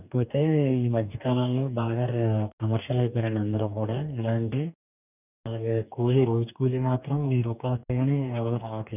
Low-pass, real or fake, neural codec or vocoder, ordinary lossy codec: 3.6 kHz; fake; codec, 44.1 kHz, 2.6 kbps, DAC; none